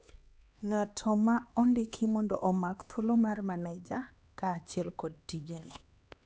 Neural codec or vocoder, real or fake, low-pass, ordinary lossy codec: codec, 16 kHz, 2 kbps, X-Codec, HuBERT features, trained on LibriSpeech; fake; none; none